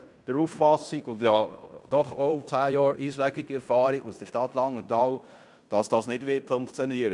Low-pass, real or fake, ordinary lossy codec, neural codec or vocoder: 10.8 kHz; fake; none; codec, 16 kHz in and 24 kHz out, 0.9 kbps, LongCat-Audio-Codec, fine tuned four codebook decoder